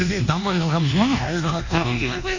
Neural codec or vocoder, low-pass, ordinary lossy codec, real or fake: codec, 24 kHz, 1.2 kbps, DualCodec; 7.2 kHz; none; fake